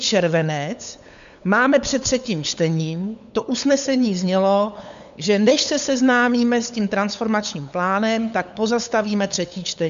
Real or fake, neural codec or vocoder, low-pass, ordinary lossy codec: fake; codec, 16 kHz, 8 kbps, FunCodec, trained on LibriTTS, 25 frames a second; 7.2 kHz; MP3, 64 kbps